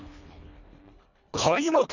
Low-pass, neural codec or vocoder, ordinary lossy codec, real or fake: 7.2 kHz; codec, 24 kHz, 1.5 kbps, HILCodec; none; fake